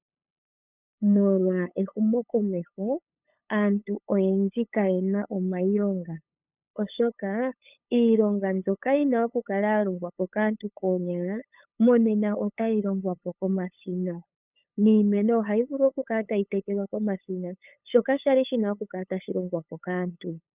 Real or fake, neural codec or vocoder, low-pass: fake; codec, 16 kHz, 8 kbps, FunCodec, trained on LibriTTS, 25 frames a second; 3.6 kHz